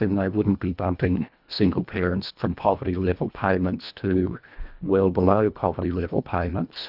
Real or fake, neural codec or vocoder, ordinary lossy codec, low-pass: fake; codec, 24 kHz, 1.5 kbps, HILCodec; Opus, 64 kbps; 5.4 kHz